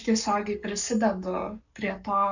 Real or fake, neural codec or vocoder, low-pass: fake; codec, 44.1 kHz, 7.8 kbps, Pupu-Codec; 7.2 kHz